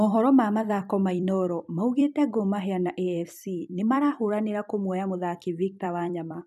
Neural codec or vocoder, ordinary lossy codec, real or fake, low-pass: vocoder, 44.1 kHz, 128 mel bands every 256 samples, BigVGAN v2; none; fake; 14.4 kHz